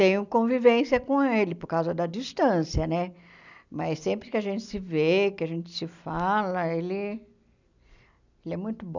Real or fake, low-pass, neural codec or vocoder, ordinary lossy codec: real; 7.2 kHz; none; none